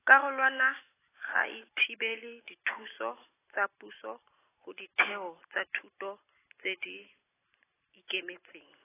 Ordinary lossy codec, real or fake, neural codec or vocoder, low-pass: AAC, 16 kbps; real; none; 3.6 kHz